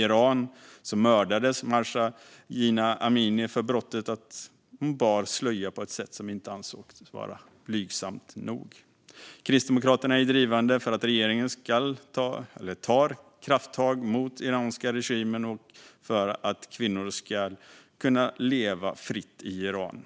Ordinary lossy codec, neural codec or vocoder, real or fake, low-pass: none; none; real; none